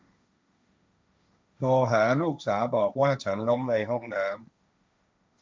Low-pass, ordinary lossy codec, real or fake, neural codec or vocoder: none; none; fake; codec, 16 kHz, 1.1 kbps, Voila-Tokenizer